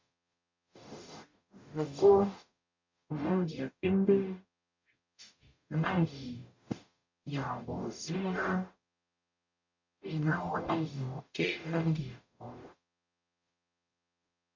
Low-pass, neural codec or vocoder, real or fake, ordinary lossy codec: 7.2 kHz; codec, 44.1 kHz, 0.9 kbps, DAC; fake; MP3, 48 kbps